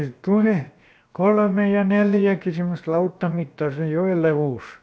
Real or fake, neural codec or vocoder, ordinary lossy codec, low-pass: fake; codec, 16 kHz, 0.7 kbps, FocalCodec; none; none